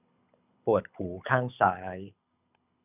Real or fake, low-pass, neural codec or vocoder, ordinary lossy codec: fake; 3.6 kHz; codec, 24 kHz, 6 kbps, HILCodec; none